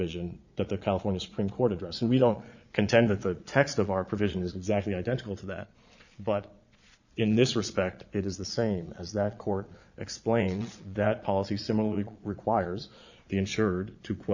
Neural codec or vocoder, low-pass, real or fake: vocoder, 22.05 kHz, 80 mel bands, Vocos; 7.2 kHz; fake